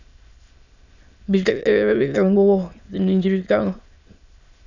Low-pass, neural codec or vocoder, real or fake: 7.2 kHz; autoencoder, 22.05 kHz, a latent of 192 numbers a frame, VITS, trained on many speakers; fake